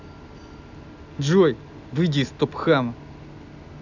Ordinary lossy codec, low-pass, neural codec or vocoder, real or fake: none; 7.2 kHz; none; real